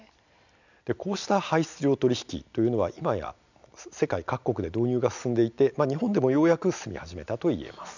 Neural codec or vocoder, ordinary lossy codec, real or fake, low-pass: none; none; real; 7.2 kHz